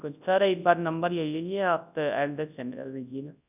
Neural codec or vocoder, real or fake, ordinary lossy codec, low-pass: codec, 24 kHz, 0.9 kbps, WavTokenizer, large speech release; fake; none; 3.6 kHz